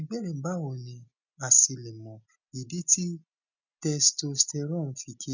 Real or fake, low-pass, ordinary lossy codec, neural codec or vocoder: real; 7.2 kHz; none; none